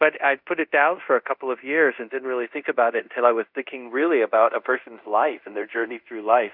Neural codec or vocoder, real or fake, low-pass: codec, 24 kHz, 0.5 kbps, DualCodec; fake; 5.4 kHz